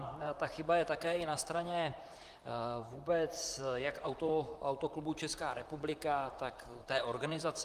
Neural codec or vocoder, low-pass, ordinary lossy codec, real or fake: vocoder, 44.1 kHz, 128 mel bands, Pupu-Vocoder; 14.4 kHz; Opus, 32 kbps; fake